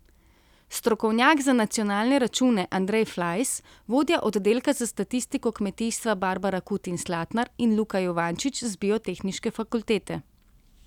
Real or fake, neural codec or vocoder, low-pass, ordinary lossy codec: real; none; 19.8 kHz; none